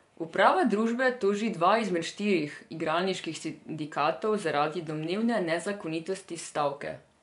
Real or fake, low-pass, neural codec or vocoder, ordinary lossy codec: fake; 10.8 kHz; vocoder, 24 kHz, 100 mel bands, Vocos; MP3, 96 kbps